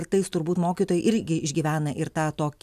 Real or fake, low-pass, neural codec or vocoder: real; 14.4 kHz; none